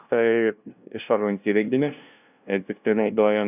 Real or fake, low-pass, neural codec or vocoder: fake; 3.6 kHz; codec, 16 kHz, 0.5 kbps, FunCodec, trained on LibriTTS, 25 frames a second